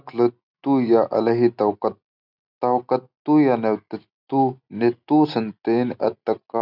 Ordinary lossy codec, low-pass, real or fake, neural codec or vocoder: none; 5.4 kHz; real; none